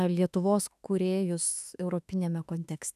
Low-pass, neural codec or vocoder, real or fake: 14.4 kHz; autoencoder, 48 kHz, 32 numbers a frame, DAC-VAE, trained on Japanese speech; fake